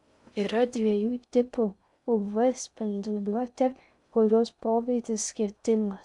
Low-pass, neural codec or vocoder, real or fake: 10.8 kHz; codec, 16 kHz in and 24 kHz out, 0.6 kbps, FocalCodec, streaming, 2048 codes; fake